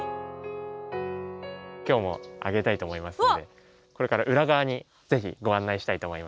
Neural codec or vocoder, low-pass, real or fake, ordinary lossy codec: none; none; real; none